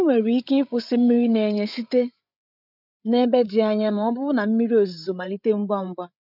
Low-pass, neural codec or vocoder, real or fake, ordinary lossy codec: 5.4 kHz; codec, 16 kHz, 8 kbps, FreqCodec, larger model; fake; none